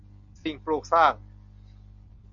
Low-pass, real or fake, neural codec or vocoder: 7.2 kHz; real; none